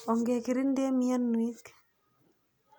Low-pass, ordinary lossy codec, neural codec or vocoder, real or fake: none; none; none; real